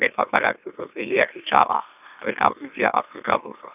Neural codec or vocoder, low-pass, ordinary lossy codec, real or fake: autoencoder, 44.1 kHz, a latent of 192 numbers a frame, MeloTTS; 3.6 kHz; none; fake